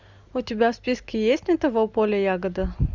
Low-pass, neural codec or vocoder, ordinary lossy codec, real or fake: 7.2 kHz; none; Opus, 64 kbps; real